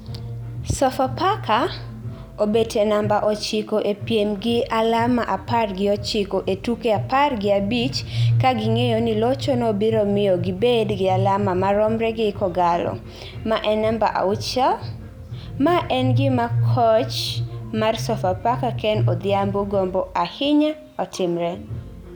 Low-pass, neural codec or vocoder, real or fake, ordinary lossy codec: none; none; real; none